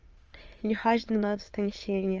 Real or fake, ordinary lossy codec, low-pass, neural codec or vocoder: fake; Opus, 24 kbps; 7.2 kHz; autoencoder, 22.05 kHz, a latent of 192 numbers a frame, VITS, trained on many speakers